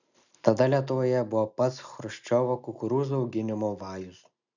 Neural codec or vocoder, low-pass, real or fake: none; 7.2 kHz; real